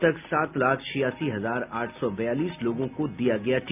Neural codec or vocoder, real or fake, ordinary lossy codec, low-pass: none; real; MP3, 32 kbps; 3.6 kHz